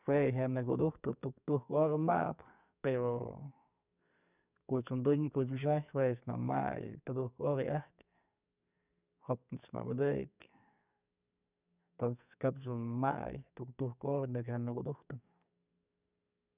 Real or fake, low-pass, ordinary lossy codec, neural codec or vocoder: fake; 3.6 kHz; none; codec, 44.1 kHz, 2.6 kbps, SNAC